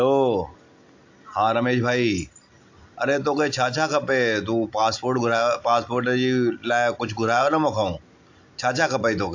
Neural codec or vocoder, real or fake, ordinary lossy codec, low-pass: none; real; AAC, 48 kbps; 7.2 kHz